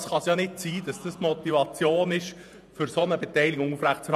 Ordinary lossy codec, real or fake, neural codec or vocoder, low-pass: none; fake; vocoder, 48 kHz, 128 mel bands, Vocos; 14.4 kHz